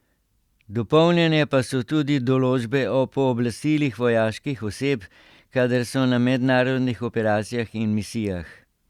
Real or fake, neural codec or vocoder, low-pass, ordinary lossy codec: real; none; 19.8 kHz; Opus, 64 kbps